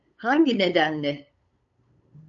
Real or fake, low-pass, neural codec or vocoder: fake; 7.2 kHz; codec, 16 kHz, 8 kbps, FunCodec, trained on LibriTTS, 25 frames a second